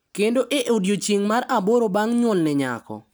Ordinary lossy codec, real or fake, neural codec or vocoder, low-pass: none; real; none; none